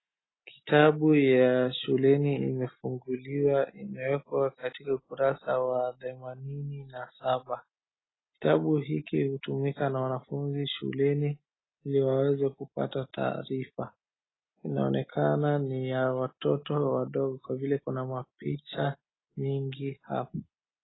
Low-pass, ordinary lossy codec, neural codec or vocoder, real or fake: 7.2 kHz; AAC, 16 kbps; none; real